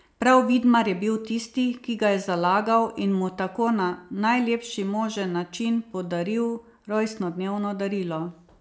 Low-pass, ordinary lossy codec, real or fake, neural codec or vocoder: none; none; real; none